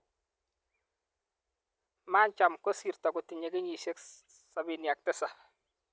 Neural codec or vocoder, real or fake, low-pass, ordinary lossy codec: none; real; none; none